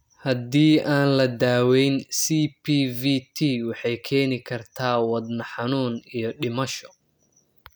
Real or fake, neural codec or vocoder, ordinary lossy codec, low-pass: real; none; none; none